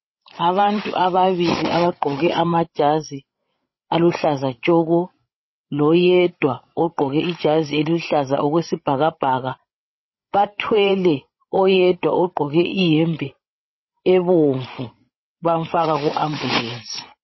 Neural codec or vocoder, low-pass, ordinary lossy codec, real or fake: codec, 16 kHz, 16 kbps, FreqCodec, larger model; 7.2 kHz; MP3, 24 kbps; fake